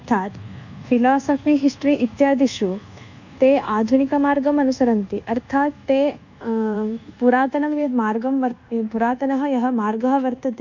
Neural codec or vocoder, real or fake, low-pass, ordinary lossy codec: codec, 24 kHz, 1.2 kbps, DualCodec; fake; 7.2 kHz; none